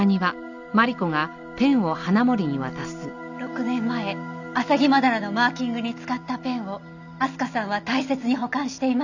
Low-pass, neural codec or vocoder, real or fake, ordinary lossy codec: 7.2 kHz; none; real; none